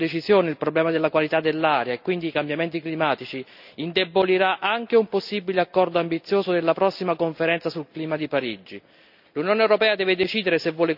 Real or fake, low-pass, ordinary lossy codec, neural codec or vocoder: real; 5.4 kHz; none; none